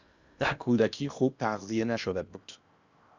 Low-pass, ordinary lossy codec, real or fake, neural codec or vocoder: 7.2 kHz; Opus, 64 kbps; fake; codec, 16 kHz in and 24 kHz out, 0.8 kbps, FocalCodec, streaming, 65536 codes